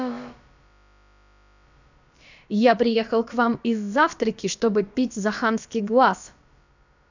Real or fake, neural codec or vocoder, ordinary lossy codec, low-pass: fake; codec, 16 kHz, about 1 kbps, DyCAST, with the encoder's durations; none; 7.2 kHz